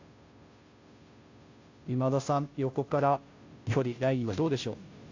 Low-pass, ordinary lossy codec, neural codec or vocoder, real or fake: 7.2 kHz; AAC, 48 kbps; codec, 16 kHz, 0.5 kbps, FunCodec, trained on Chinese and English, 25 frames a second; fake